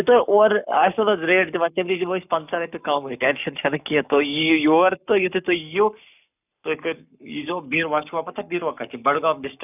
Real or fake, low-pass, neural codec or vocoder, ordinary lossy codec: fake; 3.6 kHz; codec, 44.1 kHz, 7.8 kbps, Pupu-Codec; none